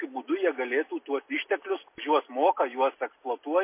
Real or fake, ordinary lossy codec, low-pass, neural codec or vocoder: real; MP3, 24 kbps; 3.6 kHz; none